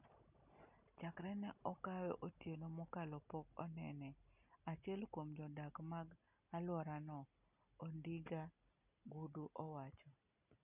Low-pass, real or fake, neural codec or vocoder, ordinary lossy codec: 3.6 kHz; real; none; none